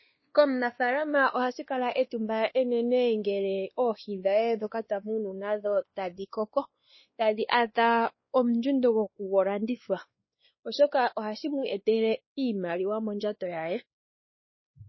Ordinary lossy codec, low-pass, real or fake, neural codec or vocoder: MP3, 24 kbps; 7.2 kHz; fake; codec, 16 kHz, 2 kbps, X-Codec, WavLM features, trained on Multilingual LibriSpeech